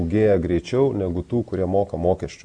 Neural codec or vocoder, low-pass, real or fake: none; 9.9 kHz; real